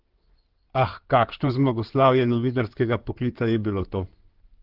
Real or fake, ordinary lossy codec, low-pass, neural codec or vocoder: fake; Opus, 16 kbps; 5.4 kHz; codec, 16 kHz in and 24 kHz out, 2.2 kbps, FireRedTTS-2 codec